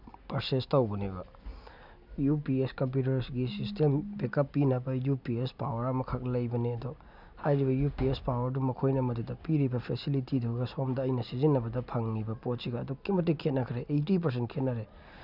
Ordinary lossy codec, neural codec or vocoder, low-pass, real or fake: none; none; 5.4 kHz; real